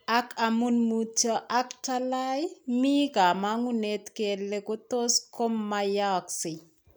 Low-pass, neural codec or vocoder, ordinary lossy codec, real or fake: none; none; none; real